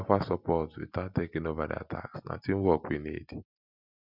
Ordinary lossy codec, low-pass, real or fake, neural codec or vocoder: none; 5.4 kHz; real; none